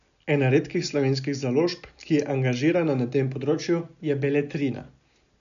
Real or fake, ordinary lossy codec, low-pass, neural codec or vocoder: real; none; 7.2 kHz; none